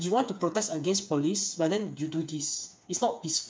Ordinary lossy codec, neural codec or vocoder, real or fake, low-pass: none; codec, 16 kHz, 4 kbps, FreqCodec, smaller model; fake; none